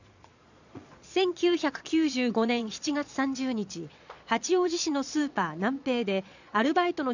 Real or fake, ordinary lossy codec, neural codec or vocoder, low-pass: fake; none; vocoder, 22.05 kHz, 80 mel bands, Vocos; 7.2 kHz